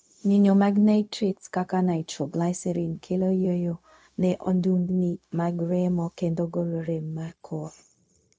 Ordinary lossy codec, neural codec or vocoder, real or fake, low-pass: none; codec, 16 kHz, 0.4 kbps, LongCat-Audio-Codec; fake; none